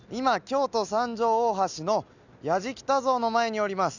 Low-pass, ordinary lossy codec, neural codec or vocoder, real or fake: 7.2 kHz; none; none; real